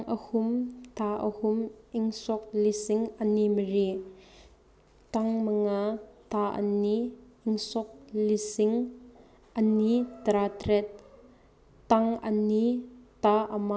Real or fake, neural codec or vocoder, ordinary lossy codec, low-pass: real; none; none; none